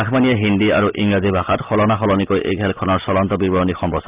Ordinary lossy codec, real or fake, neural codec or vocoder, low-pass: Opus, 24 kbps; real; none; 3.6 kHz